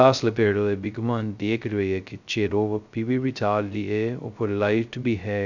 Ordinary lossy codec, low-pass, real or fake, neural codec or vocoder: none; 7.2 kHz; fake; codec, 16 kHz, 0.2 kbps, FocalCodec